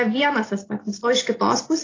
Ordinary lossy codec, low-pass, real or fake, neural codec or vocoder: AAC, 32 kbps; 7.2 kHz; real; none